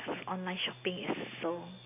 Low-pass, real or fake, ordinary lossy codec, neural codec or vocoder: 3.6 kHz; real; none; none